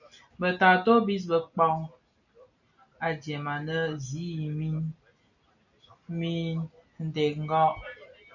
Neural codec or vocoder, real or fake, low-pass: none; real; 7.2 kHz